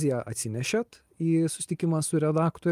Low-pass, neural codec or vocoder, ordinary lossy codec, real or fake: 14.4 kHz; none; Opus, 32 kbps; real